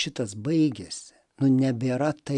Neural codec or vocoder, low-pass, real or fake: none; 10.8 kHz; real